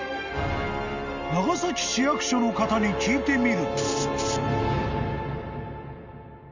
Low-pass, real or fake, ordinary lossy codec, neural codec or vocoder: 7.2 kHz; real; none; none